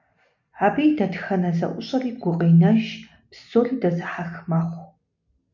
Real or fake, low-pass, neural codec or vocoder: real; 7.2 kHz; none